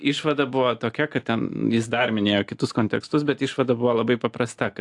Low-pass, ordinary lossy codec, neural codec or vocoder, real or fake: 10.8 kHz; AAC, 64 kbps; none; real